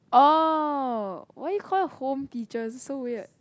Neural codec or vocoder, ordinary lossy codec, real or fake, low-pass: none; none; real; none